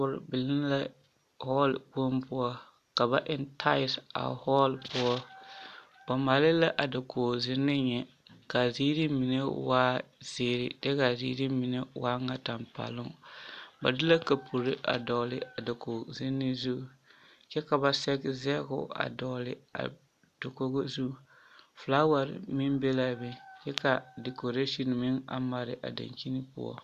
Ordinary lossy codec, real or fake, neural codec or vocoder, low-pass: AAC, 96 kbps; real; none; 14.4 kHz